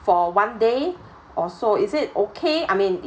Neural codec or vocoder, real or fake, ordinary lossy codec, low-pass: none; real; none; none